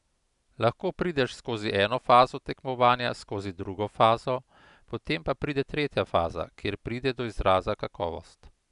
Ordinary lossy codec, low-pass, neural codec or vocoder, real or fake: none; 10.8 kHz; none; real